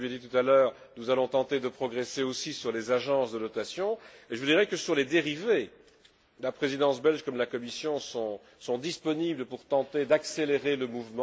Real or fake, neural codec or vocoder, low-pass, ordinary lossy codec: real; none; none; none